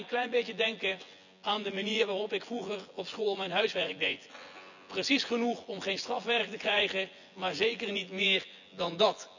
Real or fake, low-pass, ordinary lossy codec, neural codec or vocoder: fake; 7.2 kHz; none; vocoder, 24 kHz, 100 mel bands, Vocos